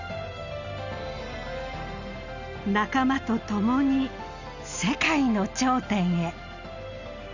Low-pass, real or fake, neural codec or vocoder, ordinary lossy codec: 7.2 kHz; real; none; none